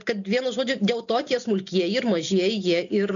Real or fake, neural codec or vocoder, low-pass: real; none; 7.2 kHz